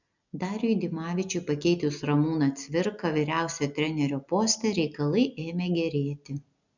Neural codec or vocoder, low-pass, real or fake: none; 7.2 kHz; real